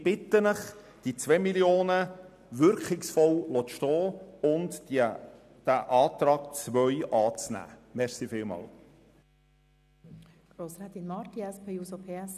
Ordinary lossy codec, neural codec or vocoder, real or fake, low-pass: none; none; real; 14.4 kHz